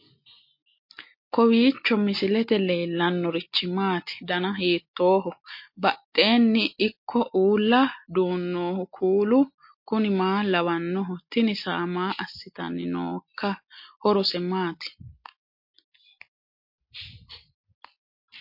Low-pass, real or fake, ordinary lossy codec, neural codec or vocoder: 5.4 kHz; real; MP3, 32 kbps; none